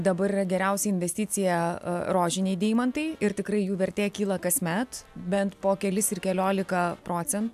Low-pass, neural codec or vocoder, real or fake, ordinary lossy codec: 14.4 kHz; none; real; AAC, 96 kbps